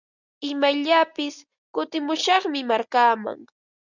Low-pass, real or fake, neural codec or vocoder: 7.2 kHz; real; none